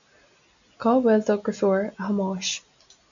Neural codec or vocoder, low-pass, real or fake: none; 7.2 kHz; real